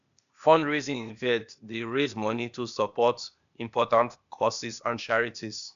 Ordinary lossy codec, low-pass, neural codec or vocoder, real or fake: AAC, 96 kbps; 7.2 kHz; codec, 16 kHz, 0.8 kbps, ZipCodec; fake